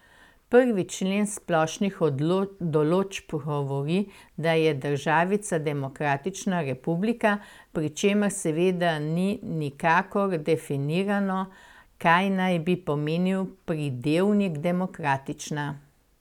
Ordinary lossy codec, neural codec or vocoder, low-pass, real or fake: none; none; 19.8 kHz; real